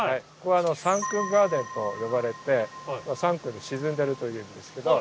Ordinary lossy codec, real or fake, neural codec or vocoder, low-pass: none; real; none; none